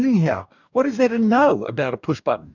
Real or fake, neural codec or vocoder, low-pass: fake; codec, 44.1 kHz, 2.6 kbps, DAC; 7.2 kHz